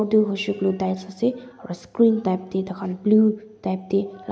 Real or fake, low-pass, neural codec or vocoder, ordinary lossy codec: real; none; none; none